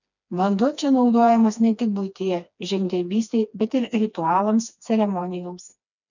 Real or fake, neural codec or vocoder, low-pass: fake; codec, 16 kHz, 2 kbps, FreqCodec, smaller model; 7.2 kHz